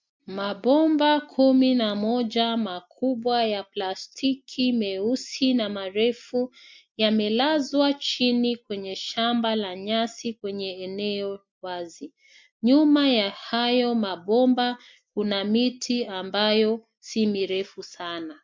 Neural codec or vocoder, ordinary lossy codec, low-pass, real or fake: none; MP3, 48 kbps; 7.2 kHz; real